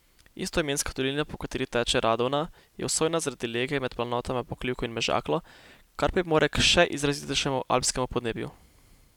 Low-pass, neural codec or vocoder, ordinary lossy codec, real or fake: 19.8 kHz; none; none; real